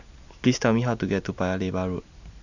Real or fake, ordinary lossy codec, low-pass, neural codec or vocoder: real; none; 7.2 kHz; none